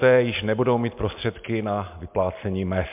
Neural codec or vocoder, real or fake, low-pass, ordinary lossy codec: none; real; 3.6 kHz; MP3, 32 kbps